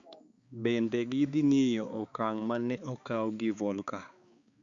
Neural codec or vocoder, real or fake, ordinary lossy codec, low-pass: codec, 16 kHz, 4 kbps, X-Codec, HuBERT features, trained on balanced general audio; fake; Opus, 64 kbps; 7.2 kHz